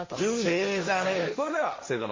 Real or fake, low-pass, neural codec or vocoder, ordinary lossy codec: fake; 7.2 kHz; codec, 16 kHz, 1.1 kbps, Voila-Tokenizer; MP3, 32 kbps